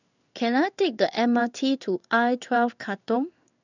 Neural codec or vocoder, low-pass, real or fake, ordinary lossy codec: codec, 16 kHz in and 24 kHz out, 1 kbps, XY-Tokenizer; 7.2 kHz; fake; none